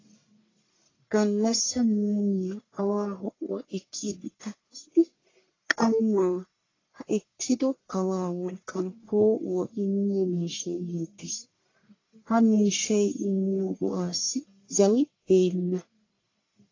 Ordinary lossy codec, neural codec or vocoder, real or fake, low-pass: AAC, 32 kbps; codec, 44.1 kHz, 1.7 kbps, Pupu-Codec; fake; 7.2 kHz